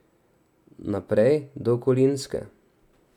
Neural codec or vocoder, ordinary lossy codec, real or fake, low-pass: none; none; real; 19.8 kHz